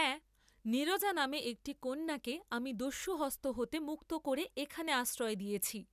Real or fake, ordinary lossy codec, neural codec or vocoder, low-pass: real; none; none; 14.4 kHz